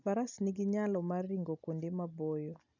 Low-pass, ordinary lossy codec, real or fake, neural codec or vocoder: 7.2 kHz; none; real; none